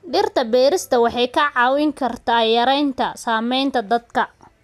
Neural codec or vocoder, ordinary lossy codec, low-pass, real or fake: none; none; 14.4 kHz; real